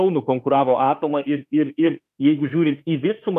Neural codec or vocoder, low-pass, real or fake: autoencoder, 48 kHz, 32 numbers a frame, DAC-VAE, trained on Japanese speech; 14.4 kHz; fake